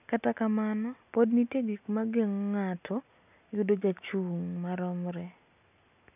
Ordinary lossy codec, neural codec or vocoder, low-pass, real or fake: none; none; 3.6 kHz; real